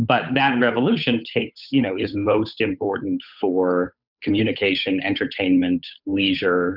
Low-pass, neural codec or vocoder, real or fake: 5.4 kHz; codec, 16 kHz, 8 kbps, FunCodec, trained on Chinese and English, 25 frames a second; fake